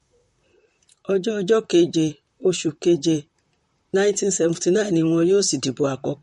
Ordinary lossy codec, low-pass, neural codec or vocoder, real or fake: MP3, 48 kbps; 19.8 kHz; vocoder, 44.1 kHz, 128 mel bands, Pupu-Vocoder; fake